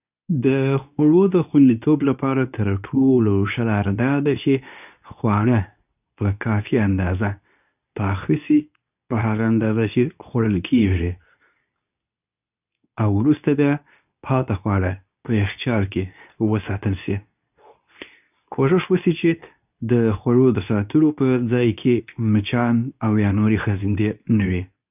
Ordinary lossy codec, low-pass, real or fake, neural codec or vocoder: none; 3.6 kHz; fake; codec, 24 kHz, 0.9 kbps, WavTokenizer, medium speech release version 2